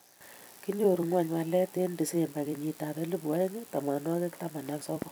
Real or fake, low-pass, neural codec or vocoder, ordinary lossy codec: fake; none; vocoder, 44.1 kHz, 128 mel bands every 256 samples, BigVGAN v2; none